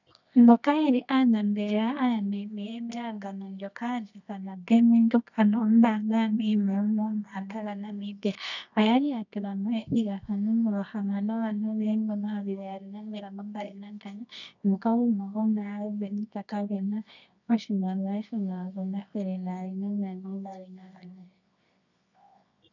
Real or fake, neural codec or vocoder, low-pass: fake; codec, 24 kHz, 0.9 kbps, WavTokenizer, medium music audio release; 7.2 kHz